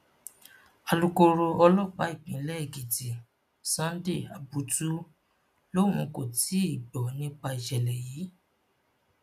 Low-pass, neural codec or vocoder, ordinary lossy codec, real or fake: 14.4 kHz; none; none; real